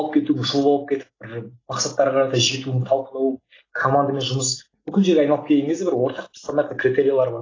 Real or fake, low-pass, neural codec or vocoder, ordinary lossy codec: real; 7.2 kHz; none; AAC, 32 kbps